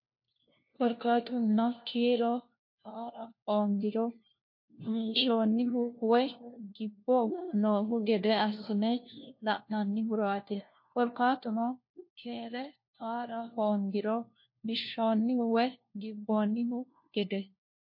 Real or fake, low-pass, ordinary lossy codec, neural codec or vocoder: fake; 5.4 kHz; MP3, 32 kbps; codec, 16 kHz, 1 kbps, FunCodec, trained on LibriTTS, 50 frames a second